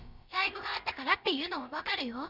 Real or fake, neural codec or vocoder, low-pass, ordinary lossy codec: fake; codec, 16 kHz, about 1 kbps, DyCAST, with the encoder's durations; 5.4 kHz; none